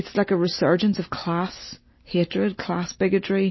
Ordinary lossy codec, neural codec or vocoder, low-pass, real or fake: MP3, 24 kbps; none; 7.2 kHz; real